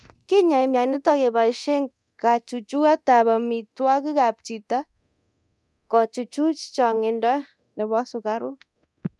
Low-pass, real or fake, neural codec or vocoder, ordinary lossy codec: none; fake; codec, 24 kHz, 0.9 kbps, DualCodec; none